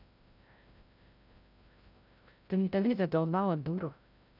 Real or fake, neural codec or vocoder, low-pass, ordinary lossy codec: fake; codec, 16 kHz, 0.5 kbps, FreqCodec, larger model; 5.4 kHz; none